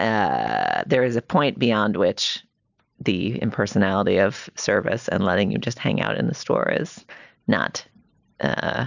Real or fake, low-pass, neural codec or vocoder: real; 7.2 kHz; none